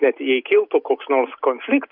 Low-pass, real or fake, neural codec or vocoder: 5.4 kHz; real; none